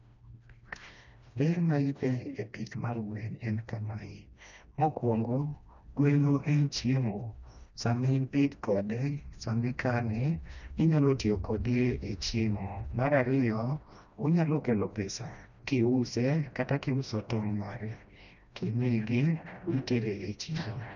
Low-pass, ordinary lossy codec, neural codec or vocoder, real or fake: 7.2 kHz; none; codec, 16 kHz, 1 kbps, FreqCodec, smaller model; fake